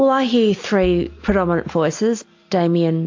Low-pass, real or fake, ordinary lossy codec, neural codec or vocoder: 7.2 kHz; real; AAC, 48 kbps; none